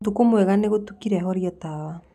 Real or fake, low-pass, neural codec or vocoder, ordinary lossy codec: real; 14.4 kHz; none; none